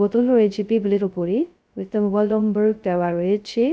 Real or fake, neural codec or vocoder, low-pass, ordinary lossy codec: fake; codec, 16 kHz, 0.2 kbps, FocalCodec; none; none